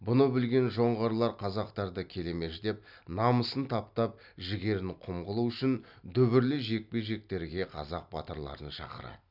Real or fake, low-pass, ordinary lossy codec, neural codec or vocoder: real; 5.4 kHz; none; none